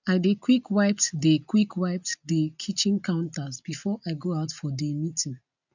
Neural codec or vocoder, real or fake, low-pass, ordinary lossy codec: none; real; 7.2 kHz; none